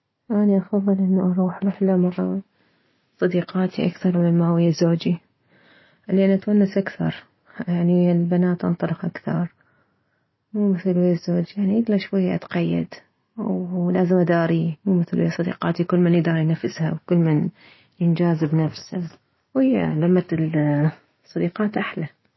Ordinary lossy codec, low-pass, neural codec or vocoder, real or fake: MP3, 24 kbps; 7.2 kHz; none; real